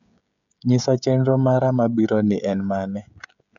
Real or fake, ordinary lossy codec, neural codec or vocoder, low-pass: fake; none; codec, 16 kHz, 16 kbps, FreqCodec, smaller model; 7.2 kHz